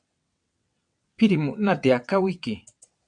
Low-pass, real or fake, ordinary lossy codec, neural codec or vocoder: 9.9 kHz; fake; MP3, 64 kbps; vocoder, 22.05 kHz, 80 mel bands, WaveNeXt